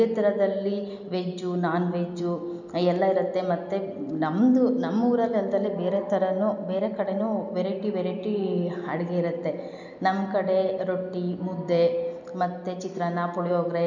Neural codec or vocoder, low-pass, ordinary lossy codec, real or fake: none; 7.2 kHz; none; real